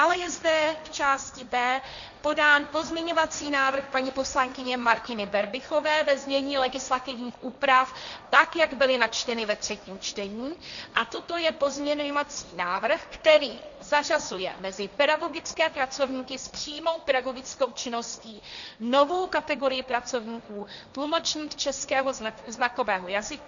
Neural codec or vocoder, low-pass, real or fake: codec, 16 kHz, 1.1 kbps, Voila-Tokenizer; 7.2 kHz; fake